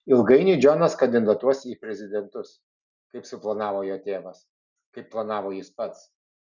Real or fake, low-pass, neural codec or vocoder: real; 7.2 kHz; none